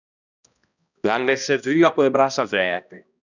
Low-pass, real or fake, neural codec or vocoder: 7.2 kHz; fake; codec, 16 kHz, 1 kbps, X-Codec, HuBERT features, trained on balanced general audio